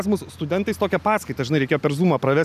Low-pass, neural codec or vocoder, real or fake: 14.4 kHz; none; real